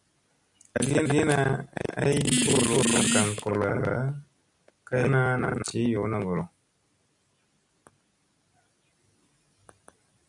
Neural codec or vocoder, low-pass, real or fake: none; 10.8 kHz; real